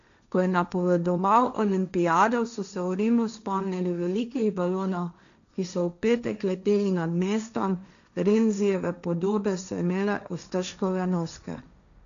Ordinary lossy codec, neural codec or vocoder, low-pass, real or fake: none; codec, 16 kHz, 1.1 kbps, Voila-Tokenizer; 7.2 kHz; fake